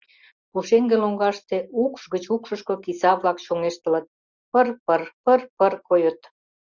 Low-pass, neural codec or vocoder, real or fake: 7.2 kHz; none; real